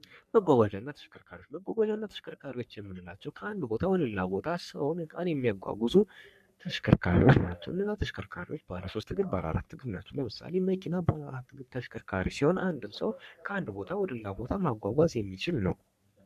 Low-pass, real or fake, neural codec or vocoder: 14.4 kHz; fake; codec, 44.1 kHz, 3.4 kbps, Pupu-Codec